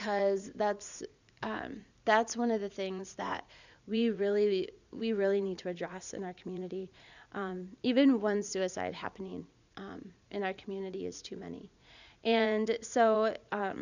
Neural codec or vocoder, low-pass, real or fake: vocoder, 44.1 kHz, 80 mel bands, Vocos; 7.2 kHz; fake